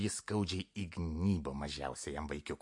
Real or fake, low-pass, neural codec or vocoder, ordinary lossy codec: real; 10.8 kHz; none; MP3, 48 kbps